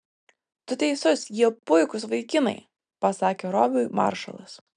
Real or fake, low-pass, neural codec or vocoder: real; 9.9 kHz; none